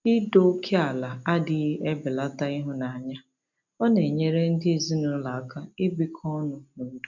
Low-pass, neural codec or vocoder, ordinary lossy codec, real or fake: 7.2 kHz; none; none; real